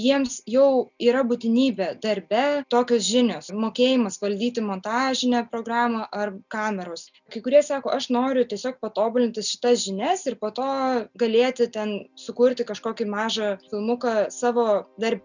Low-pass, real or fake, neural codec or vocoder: 7.2 kHz; real; none